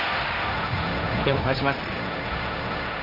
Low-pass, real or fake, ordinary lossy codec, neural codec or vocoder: 5.4 kHz; fake; none; codec, 16 kHz, 1.1 kbps, Voila-Tokenizer